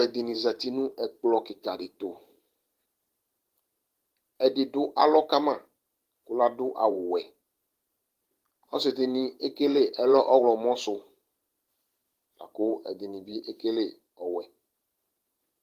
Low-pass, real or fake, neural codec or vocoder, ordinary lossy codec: 14.4 kHz; fake; vocoder, 44.1 kHz, 128 mel bands every 512 samples, BigVGAN v2; Opus, 24 kbps